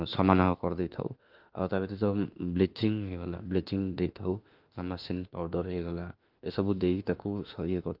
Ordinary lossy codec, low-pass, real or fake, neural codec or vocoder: Opus, 32 kbps; 5.4 kHz; fake; autoencoder, 48 kHz, 32 numbers a frame, DAC-VAE, trained on Japanese speech